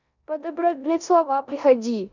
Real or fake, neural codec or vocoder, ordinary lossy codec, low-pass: fake; codec, 16 kHz in and 24 kHz out, 0.9 kbps, LongCat-Audio-Codec, four codebook decoder; none; 7.2 kHz